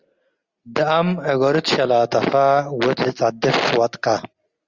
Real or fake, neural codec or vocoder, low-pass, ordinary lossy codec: real; none; 7.2 kHz; Opus, 32 kbps